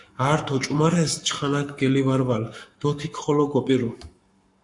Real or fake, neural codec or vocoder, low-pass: fake; codec, 44.1 kHz, 7.8 kbps, Pupu-Codec; 10.8 kHz